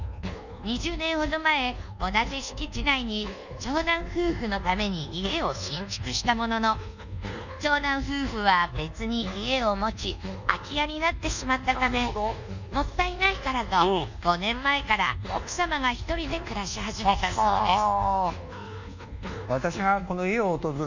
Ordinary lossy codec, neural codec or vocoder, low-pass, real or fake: none; codec, 24 kHz, 1.2 kbps, DualCodec; 7.2 kHz; fake